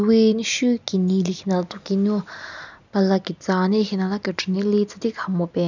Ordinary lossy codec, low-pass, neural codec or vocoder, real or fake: none; 7.2 kHz; none; real